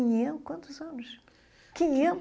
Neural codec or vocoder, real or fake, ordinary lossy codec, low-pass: none; real; none; none